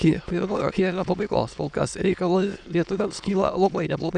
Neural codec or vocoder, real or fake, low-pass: autoencoder, 22.05 kHz, a latent of 192 numbers a frame, VITS, trained on many speakers; fake; 9.9 kHz